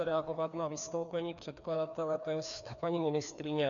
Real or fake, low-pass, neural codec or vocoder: fake; 7.2 kHz; codec, 16 kHz, 2 kbps, FreqCodec, larger model